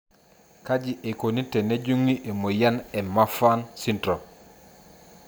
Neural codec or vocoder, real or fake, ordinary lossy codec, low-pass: none; real; none; none